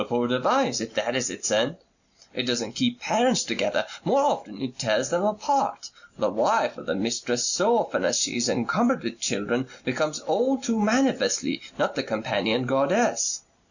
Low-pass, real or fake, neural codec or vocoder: 7.2 kHz; real; none